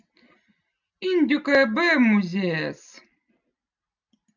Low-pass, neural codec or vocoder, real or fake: 7.2 kHz; none; real